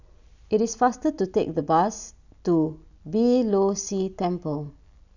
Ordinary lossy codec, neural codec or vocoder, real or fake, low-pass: none; none; real; 7.2 kHz